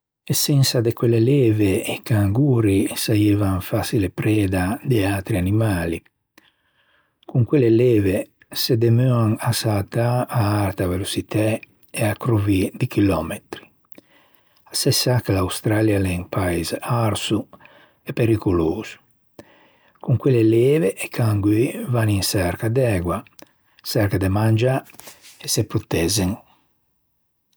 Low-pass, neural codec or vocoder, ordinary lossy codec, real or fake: none; none; none; real